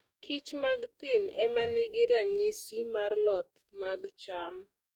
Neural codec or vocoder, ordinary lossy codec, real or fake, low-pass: codec, 44.1 kHz, 2.6 kbps, DAC; none; fake; 19.8 kHz